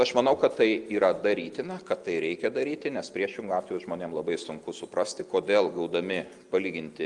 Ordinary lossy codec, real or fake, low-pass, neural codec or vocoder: Opus, 32 kbps; real; 10.8 kHz; none